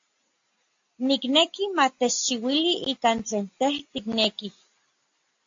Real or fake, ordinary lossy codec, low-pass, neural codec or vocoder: real; AAC, 48 kbps; 7.2 kHz; none